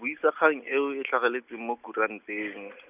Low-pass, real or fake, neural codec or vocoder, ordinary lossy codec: 3.6 kHz; real; none; none